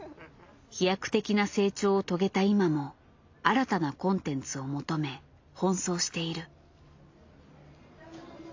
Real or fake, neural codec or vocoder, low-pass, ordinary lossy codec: real; none; 7.2 kHz; AAC, 48 kbps